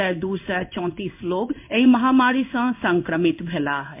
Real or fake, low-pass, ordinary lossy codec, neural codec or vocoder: fake; 3.6 kHz; MP3, 32 kbps; codec, 16 kHz in and 24 kHz out, 1 kbps, XY-Tokenizer